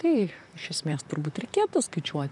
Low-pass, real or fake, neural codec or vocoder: 10.8 kHz; fake; vocoder, 44.1 kHz, 128 mel bands, Pupu-Vocoder